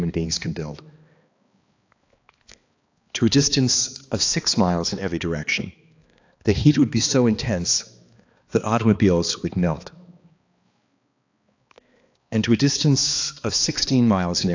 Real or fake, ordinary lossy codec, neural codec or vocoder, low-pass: fake; AAC, 48 kbps; codec, 16 kHz, 2 kbps, X-Codec, HuBERT features, trained on balanced general audio; 7.2 kHz